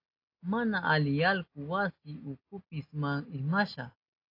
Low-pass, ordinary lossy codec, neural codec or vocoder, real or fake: 5.4 kHz; AAC, 32 kbps; none; real